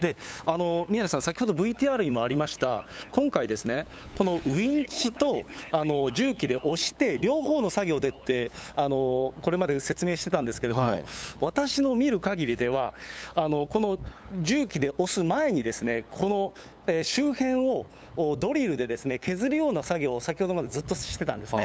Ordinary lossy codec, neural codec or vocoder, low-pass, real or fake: none; codec, 16 kHz, 8 kbps, FunCodec, trained on LibriTTS, 25 frames a second; none; fake